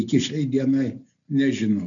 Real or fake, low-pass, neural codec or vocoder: real; 7.2 kHz; none